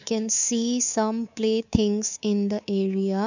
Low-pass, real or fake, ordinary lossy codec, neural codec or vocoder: 7.2 kHz; fake; none; codec, 24 kHz, 3.1 kbps, DualCodec